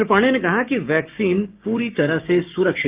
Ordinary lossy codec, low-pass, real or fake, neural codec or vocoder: Opus, 24 kbps; 3.6 kHz; fake; codec, 16 kHz, 6 kbps, DAC